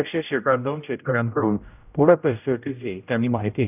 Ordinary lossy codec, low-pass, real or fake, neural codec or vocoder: none; 3.6 kHz; fake; codec, 16 kHz, 0.5 kbps, X-Codec, HuBERT features, trained on general audio